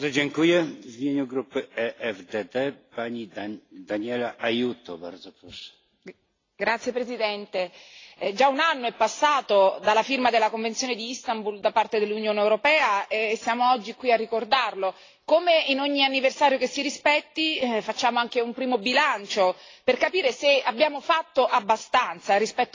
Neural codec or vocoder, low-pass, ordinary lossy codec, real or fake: none; 7.2 kHz; AAC, 32 kbps; real